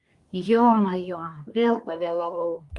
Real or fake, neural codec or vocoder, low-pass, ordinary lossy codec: fake; codec, 24 kHz, 1 kbps, SNAC; 10.8 kHz; Opus, 32 kbps